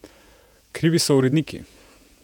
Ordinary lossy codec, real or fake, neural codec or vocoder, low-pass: none; fake; vocoder, 48 kHz, 128 mel bands, Vocos; 19.8 kHz